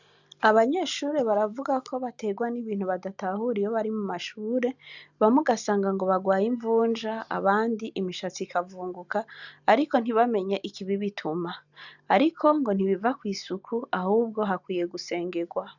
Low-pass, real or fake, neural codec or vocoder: 7.2 kHz; real; none